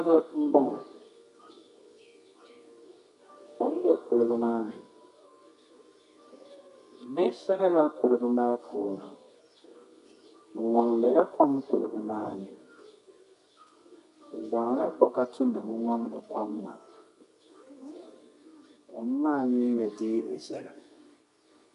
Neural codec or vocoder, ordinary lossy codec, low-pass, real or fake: codec, 24 kHz, 0.9 kbps, WavTokenizer, medium music audio release; MP3, 64 kbps; 10.8 kHz; fake